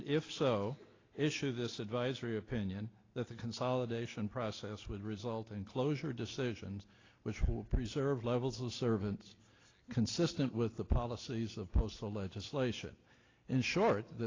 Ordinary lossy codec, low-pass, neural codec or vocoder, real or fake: AAC, 32 kbps; 7.2 kHz; none; real